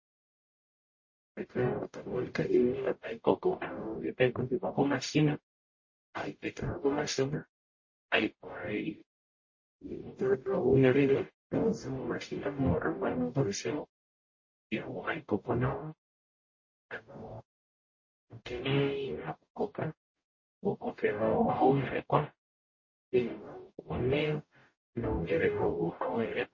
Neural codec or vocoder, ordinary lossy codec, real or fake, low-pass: codec, 44.1 kHz, 0.9 kbps, DAC; MP3, 32 kbps; fake; 7.2 kHz